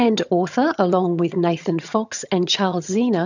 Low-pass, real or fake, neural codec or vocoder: 7.2 kHz; fake; vocoder, 22.05 kHz, 80 mel bands, HiFi-GAN